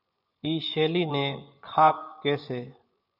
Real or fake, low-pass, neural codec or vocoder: fake; 5.4 kHz; vocoder, 24 kHz, 100 mel bands, Vocos